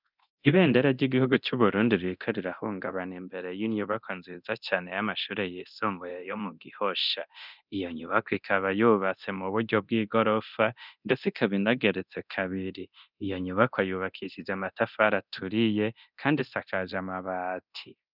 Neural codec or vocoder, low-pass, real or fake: codec, 24 kHz, 0.9 kbps, DualCodec; 5.4 kHz; fake